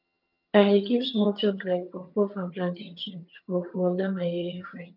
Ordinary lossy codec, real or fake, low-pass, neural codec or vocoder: none; fake; 5.4 kHz; vocoder, 22.05 kHz, 80 mel bands, HiFi-GAN